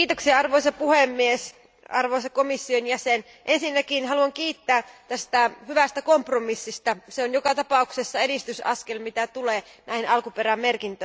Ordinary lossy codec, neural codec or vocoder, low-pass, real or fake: none; none; none; real